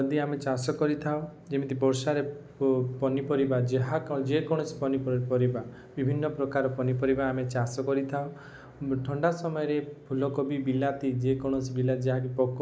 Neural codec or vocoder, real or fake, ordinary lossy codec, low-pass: none; real; none; none